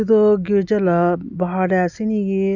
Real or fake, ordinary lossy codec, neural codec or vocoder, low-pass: real; none; none; 7.2 kHz